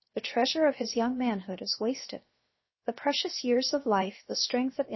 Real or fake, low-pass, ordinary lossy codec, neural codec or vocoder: fake; 7.2 kHz; MP3, 24 kbps; codec, 16 kHz, 0.7 kbps, FocalCodec